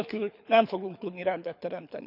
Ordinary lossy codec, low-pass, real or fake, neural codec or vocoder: none; 5.4 kHz; fake; codec, 24 kHz, 6 kbps, HILCodec